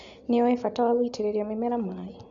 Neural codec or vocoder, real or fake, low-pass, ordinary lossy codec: none; real; 7.2 kHz; Opus, 64 kbps